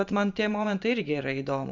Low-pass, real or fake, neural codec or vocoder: 7.2 kHz; fake; vocoder, 44.1 kHz, 128 mel bands every 512 samples, BigVGAN v2